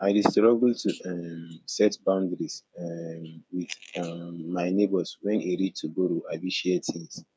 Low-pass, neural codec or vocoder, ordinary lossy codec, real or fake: none; codec, 16 kHz, 8 kbps, FreqCodec, smaller model; none; fake